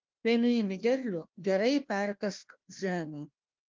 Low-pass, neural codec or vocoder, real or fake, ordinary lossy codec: 7.2 kHz; codec, 16 kHz, 1 kbps, FunCodec, trained on Chinese and English, 50 frames a second; fake; Opus, 32 kbps